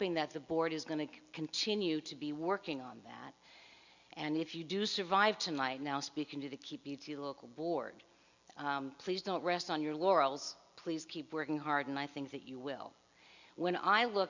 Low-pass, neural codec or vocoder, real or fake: 7.2 kHz; none; real